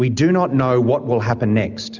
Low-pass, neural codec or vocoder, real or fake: 7.2 kHz; none; real